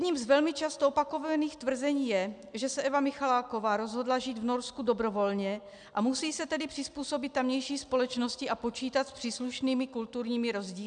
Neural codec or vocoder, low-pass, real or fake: none; 9.9 kHz; real